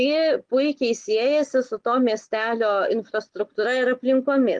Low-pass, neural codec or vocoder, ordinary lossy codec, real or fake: 9.9 kHz; none; Opus, 24 kbps; real